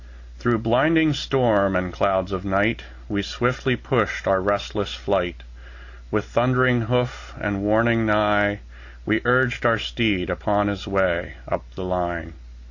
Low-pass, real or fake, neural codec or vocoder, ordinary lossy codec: 7.2 kHz; real; none; Opus, 64 kbps